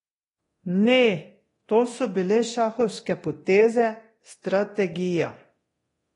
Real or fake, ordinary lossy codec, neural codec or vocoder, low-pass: fake; AAC, 32 kbps; codec, 24 kHz, 0.9 kbps, DualCodec; 10.8 kHz